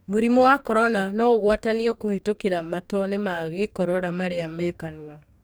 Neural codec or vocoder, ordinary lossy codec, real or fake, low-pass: codec, 44.1 kHz, 2.6 kbps, DAC; none; fake; none